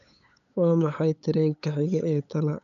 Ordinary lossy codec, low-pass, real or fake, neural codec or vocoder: none; 7.2 kHz; fake; codec, 16 kHz, 8 kbps, FunCodec, trained on LibriTTS, 25 frames a second